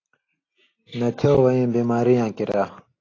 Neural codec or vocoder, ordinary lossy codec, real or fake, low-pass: none; AAC, 32 kbps; real; 7.2 kHz